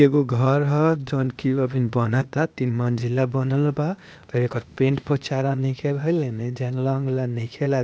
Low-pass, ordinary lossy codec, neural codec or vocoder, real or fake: none; none; codec, 16 kHz, 0.8 kbps, ZipCodec; fake